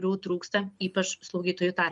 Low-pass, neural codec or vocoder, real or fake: 10.8 kHz; none; real